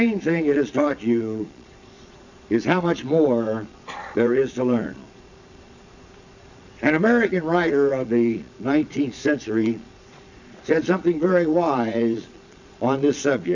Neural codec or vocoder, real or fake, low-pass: vocoder, 22.05 kHz, 80 mel bands, Vocos; fake; 7.2 kHz